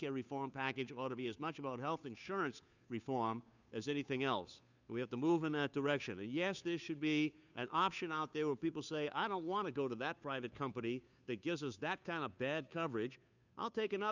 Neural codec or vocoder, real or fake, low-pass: codec, 16 kHz, 2 kbps, FunCodec, trained on Chinese and English, 25 frames a second; fake; 7.2 kHz